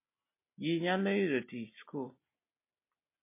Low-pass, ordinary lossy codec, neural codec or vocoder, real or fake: 3.6 kHz; MP3, 24 kbps; none; real